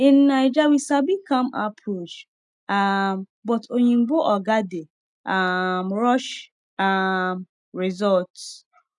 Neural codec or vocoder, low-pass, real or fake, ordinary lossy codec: none; 10.8 kHz; real; none